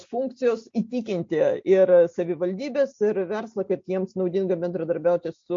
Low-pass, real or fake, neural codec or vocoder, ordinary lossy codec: 7.2 kHz; real; none; MP3, 48 kbps